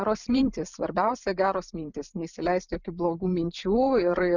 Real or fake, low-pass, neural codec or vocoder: fake; 7.2 kHz; vocoder, 44.1 kHz, 128 mel bands every 512 samples, BigVGAN v2